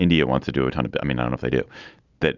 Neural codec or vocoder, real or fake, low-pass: none; real; 7.2 kHz